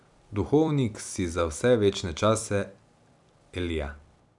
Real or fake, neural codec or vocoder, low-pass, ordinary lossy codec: fake; vocoder, 44.1 kHz, 128 mel bands every 256 samples, BigVGAN v2; 10.8 kHz; none